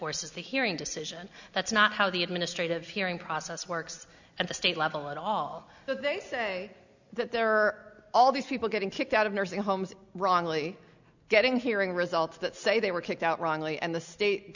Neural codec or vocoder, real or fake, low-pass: none; real; 7.2 kHz